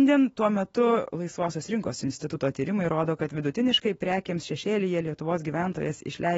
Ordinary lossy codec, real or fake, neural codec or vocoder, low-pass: AAC, 24 kbps; fake; autoencoder, 48 kHz, 128 numbers a frame, DAC-VAE, trained on Japanese speech; 19.8 kHz